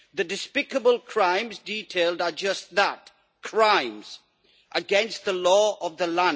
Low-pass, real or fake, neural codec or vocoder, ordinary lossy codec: none; real; none; none